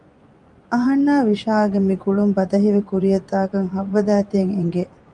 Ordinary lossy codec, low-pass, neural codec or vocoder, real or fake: Opus, 32 kbps; 10.8 kHz; vocoder, 24 kHz, 100 mel bands, Vocos; fake